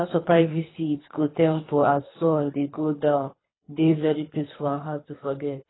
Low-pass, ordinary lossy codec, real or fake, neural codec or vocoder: 7.2 kHz; AAC, 16 kbps; fake; codec, 24 kHz, 3 kbps, HILCodec